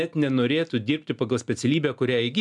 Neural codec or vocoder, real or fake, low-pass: none; real; 10.8 kHz